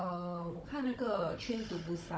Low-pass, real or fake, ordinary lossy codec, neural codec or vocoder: none; fake; none; codec, 16 kHz, 16 kbps, FunCodec, trained on Chinese and English, 50 frames a second